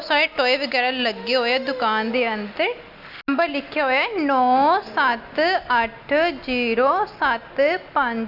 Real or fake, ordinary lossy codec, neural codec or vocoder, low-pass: real; AAC, 48 kbps; none; 5.4 kHz